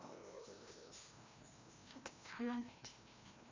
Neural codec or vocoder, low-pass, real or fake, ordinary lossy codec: codec, 16 kHz, 1 kbps, FreqCodec, larger model; 7.2 kHz; fake; MP3, 64 kbps